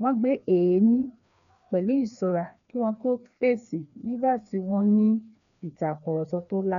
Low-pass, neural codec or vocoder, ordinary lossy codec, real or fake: 7.2 kHz; codec, 16 kHz, 2 kbps, FreqCodec, larger model; none; fake